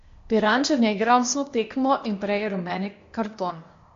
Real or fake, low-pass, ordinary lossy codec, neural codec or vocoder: fake; 7.2 kHz; MP3, 48 kbps; codec, 16 kHz, 0.8 kbps, ZipCodec